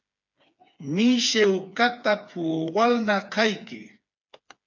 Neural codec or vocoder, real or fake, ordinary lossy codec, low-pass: codec, 16 kHz, 4 kbps, FreqCodec, smaller model; fake; MP3, 48 kbps; 7.2 kHz